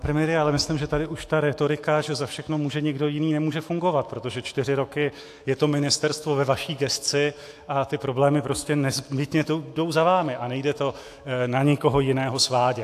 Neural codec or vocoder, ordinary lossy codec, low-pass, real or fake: autoencoder, 48 kHz, 128 numbers a frame, DAC-VAE, trained on Japanese speech; AAC, 64 kbps; 14.4 kHz; fake